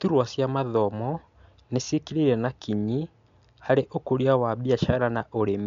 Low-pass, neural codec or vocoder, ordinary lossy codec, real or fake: 7.2 kHz; none; MP3, 64 kbps; real